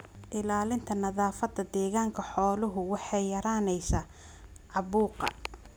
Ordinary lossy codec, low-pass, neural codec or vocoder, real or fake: none; none; none; real